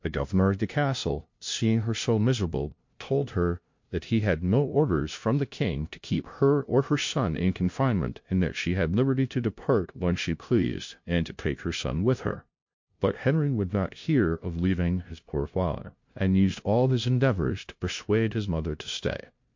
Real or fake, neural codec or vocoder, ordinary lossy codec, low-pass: fake; codec, 16 kHz, 0.5 kbps, FunCodec, trained on LibriTTS, 25 frames a second; MP3, 48 kbps; 7.2 kHz